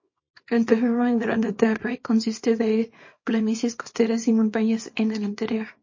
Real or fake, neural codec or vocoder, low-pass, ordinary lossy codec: fake; codec, 24 kHz, 0.9 kbps, WavTokenizer, small release; 7.2 kHz; MP3, 32 kbps